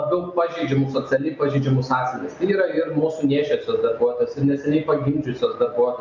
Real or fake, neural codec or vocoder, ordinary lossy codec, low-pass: real; none; MP3, 64 kbps; 7.2 kHz